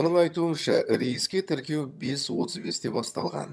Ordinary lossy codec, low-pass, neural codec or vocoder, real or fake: none; none; vocoder, 22.05 kHz, 80 mel bands, HiFi-GAN; fake